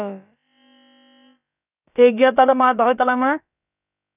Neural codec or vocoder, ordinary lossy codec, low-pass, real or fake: codec, 16 kHz, about 1 kbps, DyCAST, with the encoder's durations; none; 3.6 kHz; fake